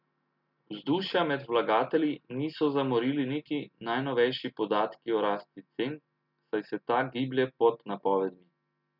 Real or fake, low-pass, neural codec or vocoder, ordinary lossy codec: real; 5.4 kHz; none; none